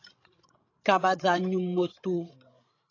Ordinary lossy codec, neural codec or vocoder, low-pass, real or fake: AAC, 32 kbps; codec, 16 kHz, 16 kbps, FreqCodec, larger model; 7.2 kHz; fake